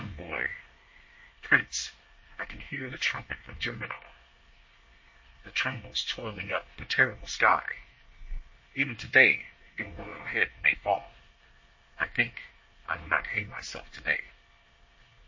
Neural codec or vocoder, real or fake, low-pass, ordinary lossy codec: codec, 24 kHz, 1 kbps, SNAC; fake; 7.2 kHz; MP3, 32 kbps